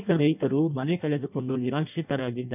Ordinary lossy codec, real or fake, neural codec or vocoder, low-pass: none; fake; codec, 16 kHz in and 24 kHz out, 0.6 kbps, FireRedTTS-2 codec; 3.6 kHz